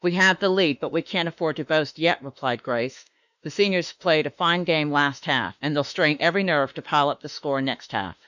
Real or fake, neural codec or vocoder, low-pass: fake; autoencoder, 48 kHz, 32 numbers a frame, DAC-VAE, trained on Japanese speech; 7.2 kHz